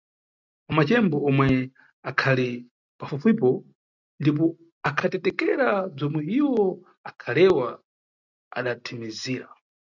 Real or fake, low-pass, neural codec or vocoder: real; 7.2 kHz; none